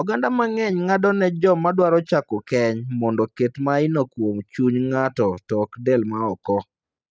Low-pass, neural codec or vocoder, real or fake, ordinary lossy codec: none; none; real; none